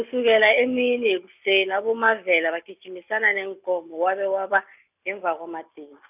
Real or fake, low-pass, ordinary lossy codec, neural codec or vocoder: real; 3.6 kHz; none; none